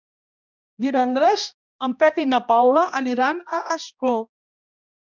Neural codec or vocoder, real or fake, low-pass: codec, 16 kHz, 1 kbps, X-Codec, HuBERT features, trained on balanced general audio; fake; 7.2 kHz